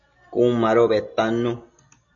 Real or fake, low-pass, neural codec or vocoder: real; 7.2 kHz; none